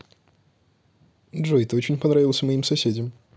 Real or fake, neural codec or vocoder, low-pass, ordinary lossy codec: real; none; none; none